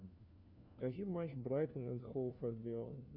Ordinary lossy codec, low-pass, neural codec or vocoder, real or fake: Opus, 64 kbps; 5.4 kHz; codec, 16 kHz, 1 kbps, FunCodec, trained on LibriTTS, 50 frames a second; fake